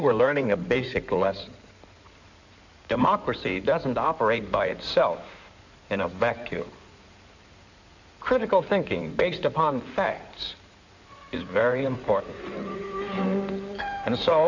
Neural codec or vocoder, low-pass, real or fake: codec, 16 kHz in and 24 kHz out, 2.2 kbps, FireRedTTS-2 codec; 7.2 kHz; fake